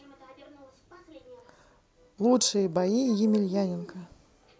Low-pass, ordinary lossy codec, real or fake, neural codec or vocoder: none; none; real; none